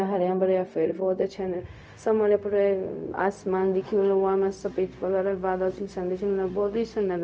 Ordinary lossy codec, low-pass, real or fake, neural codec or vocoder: none; none; fake; codec, 16 kHz, 0.4 kbps, LongCat-Audio-Codec